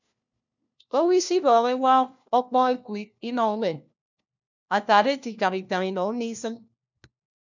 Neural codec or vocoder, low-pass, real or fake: codec, 16 kHz, 1 kbps, FunCodec, trained on LibriTTS, 50 frames a second; 7.2 kHz; fake